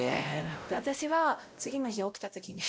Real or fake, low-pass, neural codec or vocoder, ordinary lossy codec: fake; none; codec, 16 kHz, 0.5 kbps, X-Codec, WavLM features, trained on Multilingual LibriSpeech; none